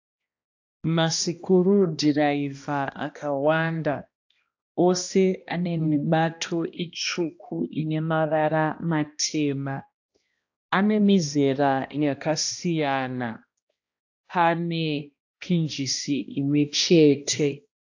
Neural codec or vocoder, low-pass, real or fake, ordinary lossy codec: codec, 16 kHz, 1 kbps, X-Codec, HuBERT features, trained on balanced general audio; 7.2 kHz; fake; AAC, 48 kbps